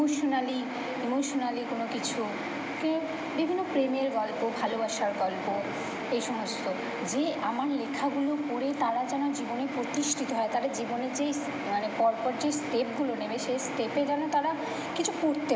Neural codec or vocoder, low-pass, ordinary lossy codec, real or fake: none; none; none; real